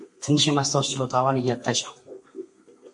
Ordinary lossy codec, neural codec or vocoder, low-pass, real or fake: MP3, 48 kbps; codec, 32 kHz, 1.9 kbps, SNAC; 10.8 kHz; fake